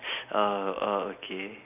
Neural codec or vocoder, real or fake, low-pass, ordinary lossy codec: none; real; 3.6 kHz; none